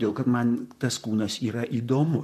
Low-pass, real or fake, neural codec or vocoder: 14.4 kHz; fake; vocoder, 44.1 kHz, 128 mel bands, Pupu-Vocoder